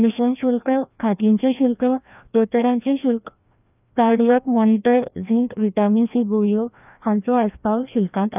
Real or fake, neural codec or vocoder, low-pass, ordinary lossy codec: fake; codec, 16 kHz, 1 kbps, FreqCodec, larger model; 3.6 kHz; none